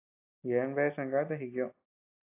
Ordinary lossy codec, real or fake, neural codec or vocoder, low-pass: AAC, 24 kbps; real; none; 3.6 kHz